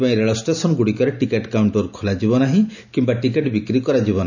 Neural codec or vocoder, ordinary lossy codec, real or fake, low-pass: none; none; real; 7.2 kHz